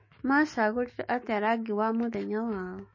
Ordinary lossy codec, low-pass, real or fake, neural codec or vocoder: MP3, 32 kbps; 7.2 kHz; fake; autoencoder, 48 kHz, 128 numbers a frame, DAC-VAE, trained on Japanese speech